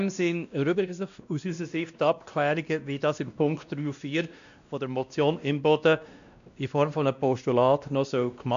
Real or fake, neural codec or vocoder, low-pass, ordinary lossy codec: fake; codec, 16 kHz, 1 kbps, X-Codec, WavLM features, trained on Multilingual LibriSpeech; 7.2 kHz; AAC, 96 kbps